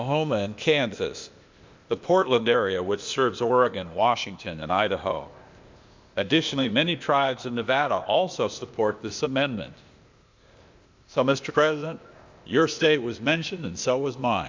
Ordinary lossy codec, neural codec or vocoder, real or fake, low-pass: MP3, 64 kbps; codec, 16 kHz, 0.8 kbps, ZipCodec; fake; 7.2 kHz